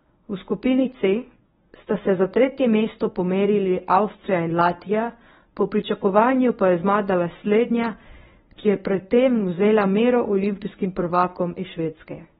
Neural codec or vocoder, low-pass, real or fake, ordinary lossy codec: codec, 24 kHz, 0.9 kbps, WavTokenizer, medium speech release version 1; 10.8 kHz; fake; AAC, 16 kbps